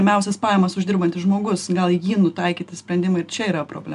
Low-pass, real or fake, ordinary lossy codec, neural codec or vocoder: 10.8 kHz; real; AAC, 96 kbps; none